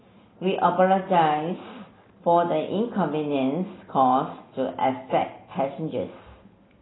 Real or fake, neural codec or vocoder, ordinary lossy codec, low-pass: real; none; AAC, 16 kbps; 7.2 kHz